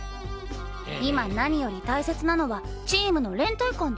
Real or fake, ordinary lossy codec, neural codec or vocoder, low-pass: real; none; none; none